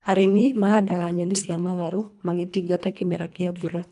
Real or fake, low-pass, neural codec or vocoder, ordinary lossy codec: fake; 10.8 kHz; codec, 24 kHz, 1.5 kbps, HILCodec; none